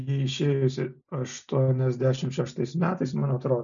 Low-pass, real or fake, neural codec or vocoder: 7.2 kHz; real; none